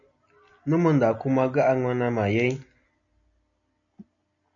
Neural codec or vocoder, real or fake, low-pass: none; real; 7.2 kHz